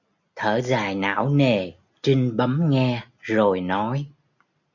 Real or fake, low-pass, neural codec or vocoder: real; 7.2 kHz; none